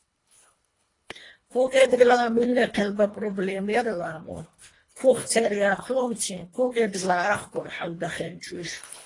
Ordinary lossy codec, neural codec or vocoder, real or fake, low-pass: AAC, 32 kbps; codec, 24 kHz, 1.5 kbps, HILCodec; fake; 10.8 kHz